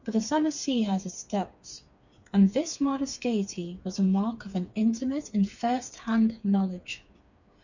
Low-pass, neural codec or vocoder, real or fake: 7.2 kHz; codec, 16 kHz, 4 kbps, FreqCodec, smaller model; fake